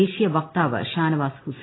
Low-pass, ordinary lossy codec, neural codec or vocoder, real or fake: 7.2 kHz; AAC, 16 kbps; none; real